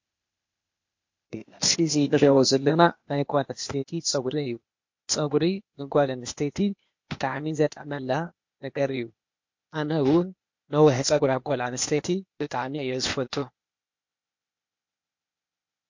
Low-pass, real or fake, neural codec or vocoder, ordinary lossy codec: 7.2 kHz; fake; codec, 16 kHz, 0.8 kbps, ZipCodec; MP3, 48 kbps